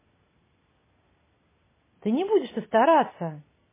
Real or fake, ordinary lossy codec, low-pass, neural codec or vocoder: real; MP3, 16 kbps; 3.6 kHz; none